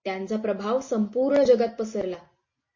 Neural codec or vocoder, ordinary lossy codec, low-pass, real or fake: none; MP3, 32 kbps; 7.2 kHz; real